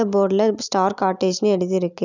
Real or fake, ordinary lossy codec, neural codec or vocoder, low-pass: real; none; none; 7.2 kHz